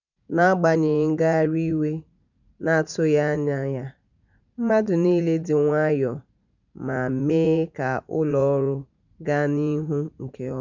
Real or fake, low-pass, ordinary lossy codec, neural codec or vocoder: fake; 7.2 kHz; none; vocoder, 44.1 kHz, 80 mel bands, Vocos